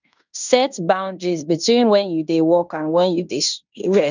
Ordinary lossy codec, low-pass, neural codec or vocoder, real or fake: none; 7.2 kHz; codec, 16 kHz in and 24 kHz out, 0.9 kbps, LongCat-Audio-Codec, fine tuned four codebook decoder; fake